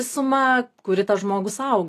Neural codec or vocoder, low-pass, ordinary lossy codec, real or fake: none; 14.4 kHz; AAC, 48 kbps; real